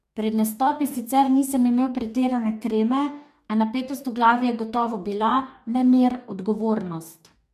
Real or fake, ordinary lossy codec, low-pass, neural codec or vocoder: fake; MP3, 96 kbps; 14.4 kHz; codec, 44.1 kHz, 2.6 kbps, DAC